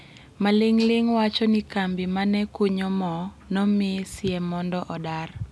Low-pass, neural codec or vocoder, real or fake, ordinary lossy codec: none; none; real; none